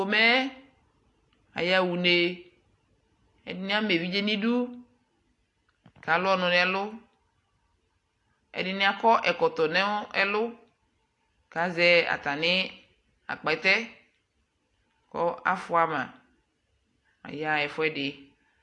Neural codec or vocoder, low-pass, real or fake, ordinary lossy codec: none; 10.8 kHz; real; AAC, 48 kbps